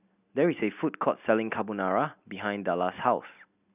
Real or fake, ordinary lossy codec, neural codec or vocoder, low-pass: real; none; none; 3.6 kHz